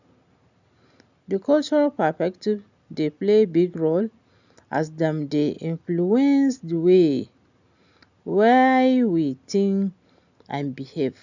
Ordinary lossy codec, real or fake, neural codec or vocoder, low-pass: none; real; none; 7.2 kHz